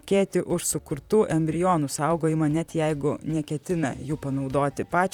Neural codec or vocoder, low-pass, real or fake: vocoder, 44.1 kHz, 128 mel bands, Pupu-Vocoder; 19.8 kHz; fake